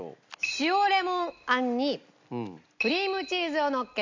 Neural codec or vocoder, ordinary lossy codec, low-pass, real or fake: none; MP3, 64 kbps; 7.2 kHz; real